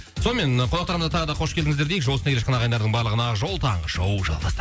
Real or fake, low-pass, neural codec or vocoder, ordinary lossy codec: real; none; none; none